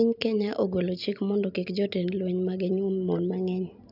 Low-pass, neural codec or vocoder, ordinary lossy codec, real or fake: 5.4 kHz; none; none; real